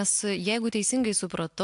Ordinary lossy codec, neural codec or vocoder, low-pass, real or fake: AAC, 64 kbps; none; 10.8 kHz; real